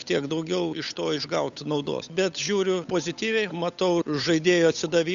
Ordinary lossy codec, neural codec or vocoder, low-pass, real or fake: AAC, 96 kbps; codec, 16 kHz, 8 kbps, FunCodec, trained on Chinese and English, 25 frames a second; 7.2 kHz; fake